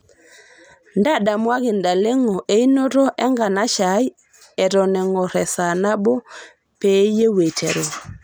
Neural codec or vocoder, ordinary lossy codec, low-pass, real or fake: none; none; none; real